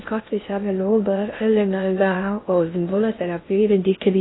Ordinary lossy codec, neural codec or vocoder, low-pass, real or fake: AAC, 16 kbps; codec, 16 kHz in and 24 kHz out, 0.6 kbps, FocalCodec, streaming, 2048 codes; 7.2 kHz; fake